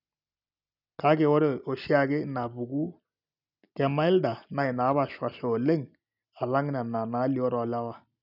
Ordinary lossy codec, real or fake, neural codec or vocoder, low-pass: none; real; none; 5.4 kHz